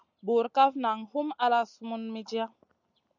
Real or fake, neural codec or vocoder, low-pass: real; none; 7.2 kHz